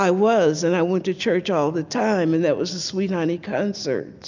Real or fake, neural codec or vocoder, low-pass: real; none; 7.2 kHz